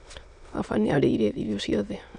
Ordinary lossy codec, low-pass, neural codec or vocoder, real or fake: none; 9.9 kHz; autoencoder, 22.05 kHz, a latent of 192 numbers a frame, VITS, trained on many speakers; fake